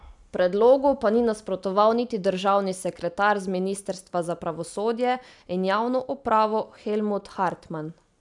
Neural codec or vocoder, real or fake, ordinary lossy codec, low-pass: none; real; MP3, 96 kbps; 10.8 kHz